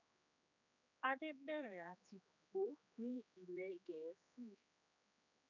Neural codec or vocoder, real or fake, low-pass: codec, 16 kHz, 2 kbps, X-Codec, HuBERT features, trained on general audio; fake; 7.2 kHz